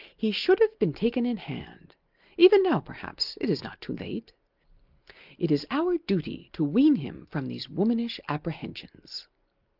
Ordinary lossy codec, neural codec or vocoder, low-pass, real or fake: Opus, 24 kbps; none; 5.4 kHz; real